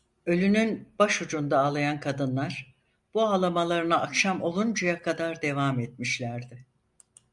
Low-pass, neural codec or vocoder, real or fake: 10.8 kHz; none; real